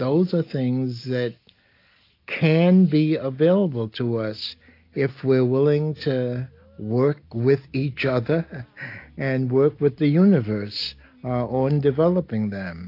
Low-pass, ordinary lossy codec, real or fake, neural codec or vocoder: 5.4 kHz; AAC, 32 kbps; real; none